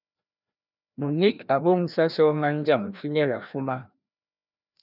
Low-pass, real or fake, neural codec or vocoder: 5.4 kHz; fake; codec, 16 kHz, 1 kbps, FreqCodec, larger model